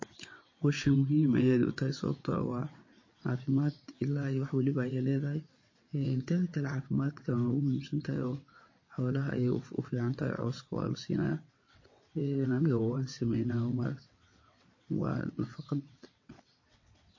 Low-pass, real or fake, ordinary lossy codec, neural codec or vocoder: 7.2 kHz; fake; MP3, 32 kbps; vocoder, 22.05 kHz, 80 mel bands, WaveNeXt